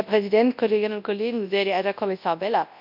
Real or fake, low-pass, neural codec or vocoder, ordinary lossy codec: fake; 5.4 kHz; codec, 24 kHz, 0.9 kbps, WavTokenizer, large speech release; MP3, 48 kbps